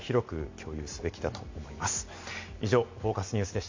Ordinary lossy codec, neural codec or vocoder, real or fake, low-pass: MP3, 64 kbps; none; real; 7.2 kHz